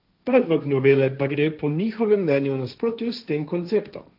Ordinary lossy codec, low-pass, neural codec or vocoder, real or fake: none; 5.4 kHz; codec, 16 kHz, 1.1 kbps, Voila-Tokenizer; fake